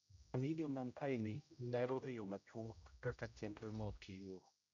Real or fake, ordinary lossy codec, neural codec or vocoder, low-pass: fake; none; codec, 16 kHz, 0.5 kbps, X-Codec, HuBERT features, trained on general audio; 7.2 kHz